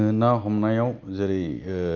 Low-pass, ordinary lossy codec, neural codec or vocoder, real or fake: 7.2 kHz; Opus, 24 kbps; none; real